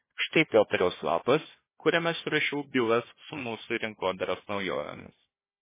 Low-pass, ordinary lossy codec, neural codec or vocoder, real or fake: 3.6 kHz; MP3, 16 kbps; codec, 16 kHz, 1 kbps, FunCodec, trained on Chinese and English, 50 frames a second; fake